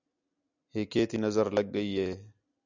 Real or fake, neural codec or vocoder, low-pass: real; none; 7.2 kHz